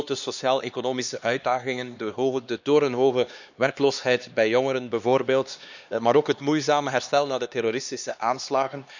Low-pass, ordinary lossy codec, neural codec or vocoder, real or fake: 7.2 kHz; none; codec, 16 kHz, 2 kbps, X-Codec, HuBERT features, trained on LibriSpeech; fake